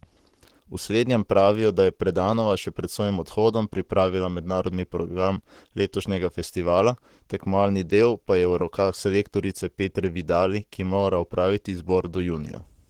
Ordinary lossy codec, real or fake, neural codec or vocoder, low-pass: Opus, 16 kbps; fake; codec, 44.1 kHz, 7.8 kbps, Pupu-Codec; 19.8 kHz